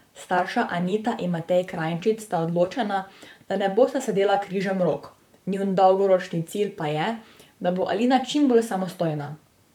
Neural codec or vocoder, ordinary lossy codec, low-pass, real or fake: vocoder, 44.1 kHz, 128 mel bands, Pupu-Vocoder; none; 19.8 kHz; fake